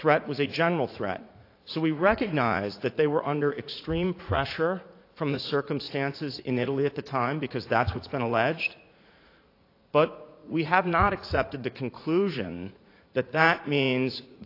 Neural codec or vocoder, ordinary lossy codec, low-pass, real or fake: vocoder, 44.1 kHz, 80 mel bands, Vocos; AAC, 32 kbps; 5.4 kHz; fake